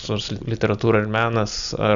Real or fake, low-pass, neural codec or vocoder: real; 7.2 kHz; none